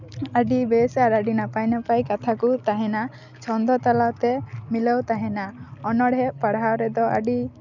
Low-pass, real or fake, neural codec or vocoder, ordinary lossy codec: 7.2 kHz; real; none; none